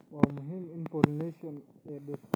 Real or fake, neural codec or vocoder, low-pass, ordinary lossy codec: real; none; none; none